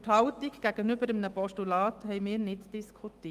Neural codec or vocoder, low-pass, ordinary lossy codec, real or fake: autoencoder, 48 kHz, 128 numbers a frame, DAC-VAE, trained on Japanese speech; 14.4 kHz; Opus, 24 kbps; fake